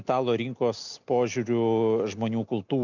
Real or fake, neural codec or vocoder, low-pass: real; none; 7.2 kHz